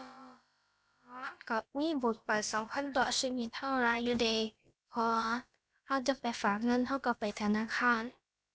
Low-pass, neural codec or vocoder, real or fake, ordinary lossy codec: none; codec, 16 kHz, about 1 kbps, DyCAST, with the encoder's durations; fake; none